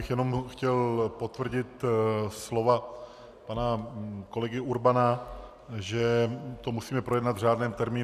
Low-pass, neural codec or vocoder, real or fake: 14.4 kHz; none; real